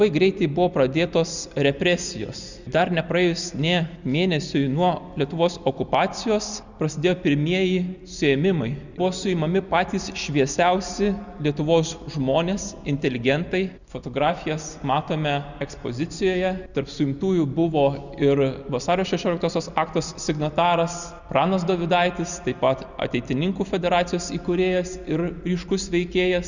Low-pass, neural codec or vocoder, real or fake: 7.2 kHz; none; real